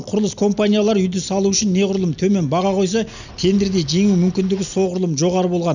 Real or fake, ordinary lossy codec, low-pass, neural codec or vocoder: real; none; 7.2 kHz; none